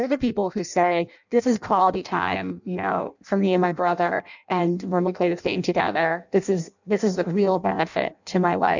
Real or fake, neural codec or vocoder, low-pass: fake; codec, 16 kHz in and 24 kHz out, 0.6 kbps, FireRedTTS-2 codec; 7.2 kHz